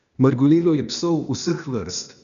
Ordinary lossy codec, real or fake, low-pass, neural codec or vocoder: none; fake; 7.2 kHz; codec, 16 kHz, 0.8 kbps, ZipCodec